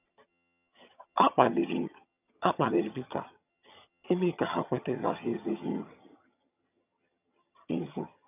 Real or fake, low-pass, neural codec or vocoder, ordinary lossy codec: fake; 3.6 kHz; vocoder, 22.05 kHz, 80 mel bands, HiFi-GAN; none